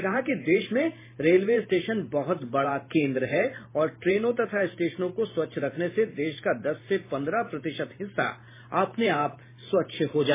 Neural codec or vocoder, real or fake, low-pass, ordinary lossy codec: vocoder, 44.1 kHz, 128 mel bands every 512 samples, BigVGAN v2; fake; 3.6 kHz; MP3, 16 kbps